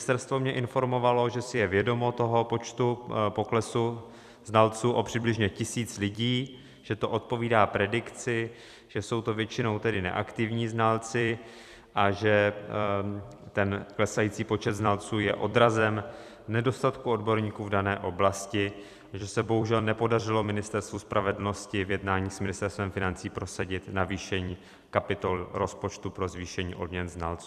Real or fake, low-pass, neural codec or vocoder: fake; 14.4 kHz; vocoder, 44.1 kHz, 128 mel bands every 256 samples, BigVGAN v2